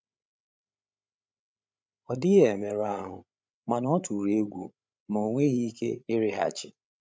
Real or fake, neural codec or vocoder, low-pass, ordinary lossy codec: fake; codec, 16 kHz, 8 kbps, FreqCodec, larger model; none; none